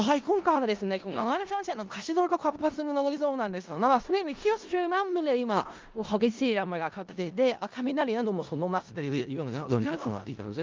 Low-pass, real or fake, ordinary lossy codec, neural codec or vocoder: 7.2 kHz; fake; Opus, 32 kbps; codec, 16 kHz in and 24 kHz out, 0.4 kbps, LongCat-Audio-Codec, four codebook decoder